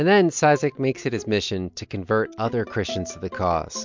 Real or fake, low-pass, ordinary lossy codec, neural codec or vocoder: real; 7.2 kHz; MP3, 64 kbps; none